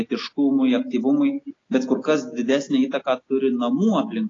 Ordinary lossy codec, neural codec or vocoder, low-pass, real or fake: AAC, 32 kbps; none; 7.2 kHz; real